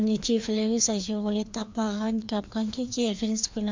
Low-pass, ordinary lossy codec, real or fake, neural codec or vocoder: 7.2 kHz; MP3, 64 kbps; fake; codec, 16 kHz, 2 kbps, FreqCodec, larger model